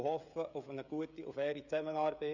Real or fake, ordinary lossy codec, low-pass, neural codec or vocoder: fake; none; 7.2 kHz; codec, 16 kHz, 16 kbps, FreqCodec, smaller model